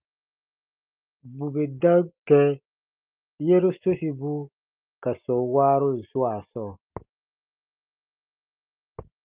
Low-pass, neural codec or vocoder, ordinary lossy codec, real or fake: 3.6 kHz; none; Opus, 32 kbps; real